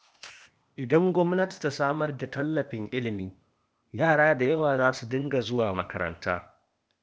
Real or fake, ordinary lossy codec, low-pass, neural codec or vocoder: fake; none; none; codec, 16 kHz, 0.8 kbps, ZipCodec